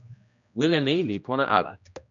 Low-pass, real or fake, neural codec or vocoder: 7.2 kHz; fake; codec, 16 kHz, 1 kbps, X-Codec, HuBERT features, trained on general audio